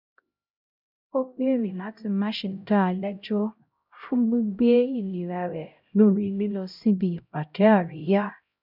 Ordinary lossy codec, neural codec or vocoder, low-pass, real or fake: none; codec, 16 kHz, 0.5 kbps, X-Codec, HuBERT features, trained on LibriSpeech; 5.4 kHz; fake